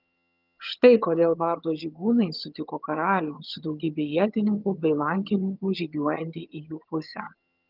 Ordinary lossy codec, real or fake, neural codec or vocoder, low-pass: Opus, 32 kbps; fake; vocoder, 22.05 kHz, 80 mel bands, HiFi-GAN; 5.4 kHz